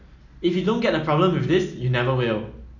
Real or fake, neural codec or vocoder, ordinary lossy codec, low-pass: real; none; none; 7.2 kHz